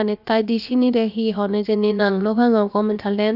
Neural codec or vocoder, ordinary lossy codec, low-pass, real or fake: codec, 16 kHz, about 1 kbps, DyCAST, with the encoder's durations; none; 5.4 kHz; fake